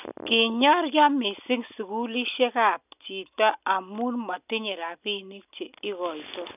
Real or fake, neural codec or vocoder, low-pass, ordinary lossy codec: real; none; 3.6 kHz; none